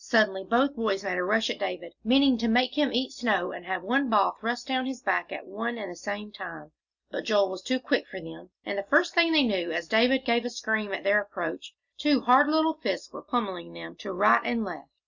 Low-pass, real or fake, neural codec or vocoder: 7.2 kHz; real; none